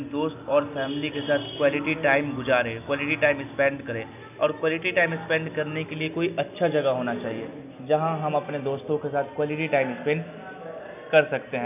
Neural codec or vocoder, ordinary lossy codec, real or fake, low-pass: none; none; real; 3.6 kHz